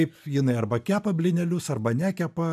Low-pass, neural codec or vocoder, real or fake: 14.4 kHz; none; real